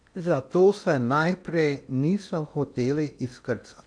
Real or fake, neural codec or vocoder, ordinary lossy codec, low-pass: fake; codec, 16 kHz in and 24 kHz out, 0.6 kbps, FocalCodec, streaming, 2048 codes; none; 9.9 kHz